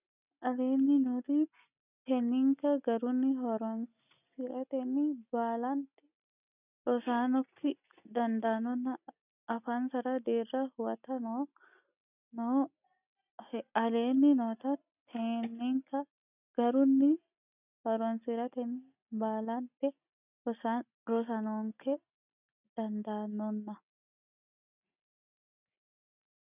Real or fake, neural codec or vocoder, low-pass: real; none; 3.6 kHz